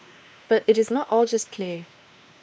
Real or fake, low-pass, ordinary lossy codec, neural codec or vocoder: fake; none; none; codec, 16 kHz, 2 kbps, X-Codec, WavLM features, trained on Multilingual LibriSpeech